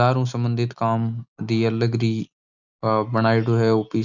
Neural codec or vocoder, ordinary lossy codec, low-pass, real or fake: none; none; 7.2 kHz; real